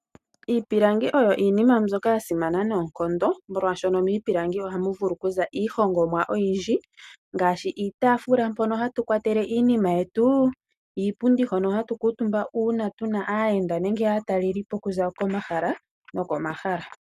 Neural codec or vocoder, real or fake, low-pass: none; real; 14.4 kHz